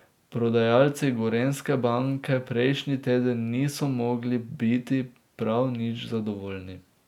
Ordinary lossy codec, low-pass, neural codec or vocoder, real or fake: none; 19.8 kHz; none; real